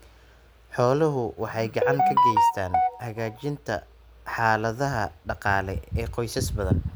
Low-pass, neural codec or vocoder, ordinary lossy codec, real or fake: none; none; none; real